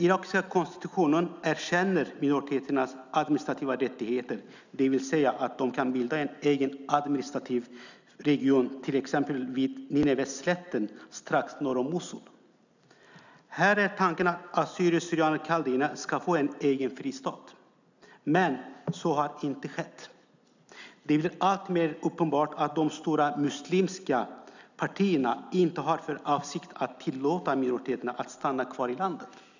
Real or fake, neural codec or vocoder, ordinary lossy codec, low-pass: real; none; none; 7.2 kHz